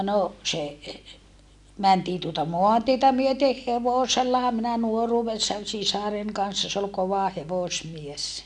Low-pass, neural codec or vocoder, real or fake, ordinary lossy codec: 10.8 kHz; none; real; none